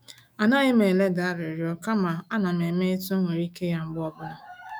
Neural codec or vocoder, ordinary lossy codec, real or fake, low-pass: autoencoder, 48 kHz, 128 numbers a frame, DAC-VAE, trained on Japanese speech; none; fake; none